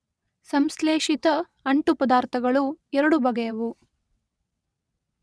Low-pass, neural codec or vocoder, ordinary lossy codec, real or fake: none; vocoder, 22.05 kHz, 80 mel bands, WaveNeXt; none; fake